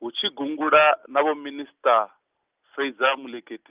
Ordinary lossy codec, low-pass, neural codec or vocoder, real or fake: Opus, 32 kbps; 3.6 kHz; none; real